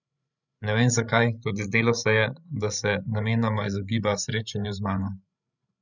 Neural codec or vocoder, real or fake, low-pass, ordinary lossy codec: codec, 16 kHz, 16 kbps, FreqCodec, larger model; fake; 7.2 kHz; none